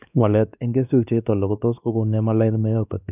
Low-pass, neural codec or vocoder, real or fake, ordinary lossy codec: 3.6 kHz; codec, 16 kHz, 2 kbps, X-Codec, WavLM features, trained on Multilingual LibriSpeech; fake; none